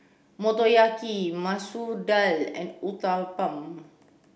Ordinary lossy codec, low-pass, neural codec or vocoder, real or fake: none; none; none; real